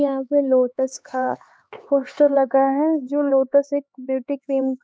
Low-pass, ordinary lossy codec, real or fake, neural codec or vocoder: none; none; fake; codec, 16 kHz, 4 kbps, X-Codec, HuBERT features, trained on LibriSpeech